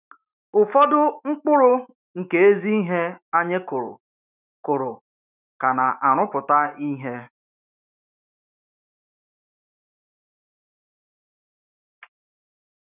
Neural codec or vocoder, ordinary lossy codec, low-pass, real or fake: autoencoder, 48 kHz, 128 numbers a frame, DAC-VAE, trained on Japanese speech; none; 3.6 kHz; fake